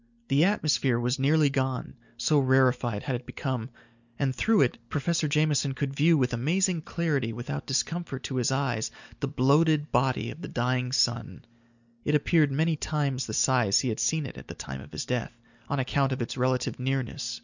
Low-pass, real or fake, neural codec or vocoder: 7.2 kHz; real; none